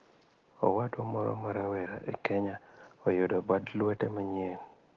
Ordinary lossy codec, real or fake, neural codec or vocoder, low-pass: Opus, 16 kbps; real; none; 7.2 kHz